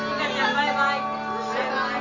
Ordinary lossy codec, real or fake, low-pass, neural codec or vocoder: none; real; 7.2 kHz; none